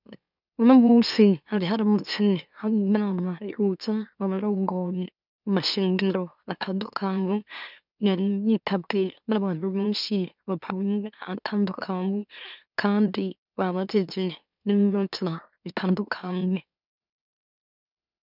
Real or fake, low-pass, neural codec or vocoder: fake; 5.4 kHz; autoencoder, 44.1 kHz, a latent of 192 numbers a frame, MeloTTS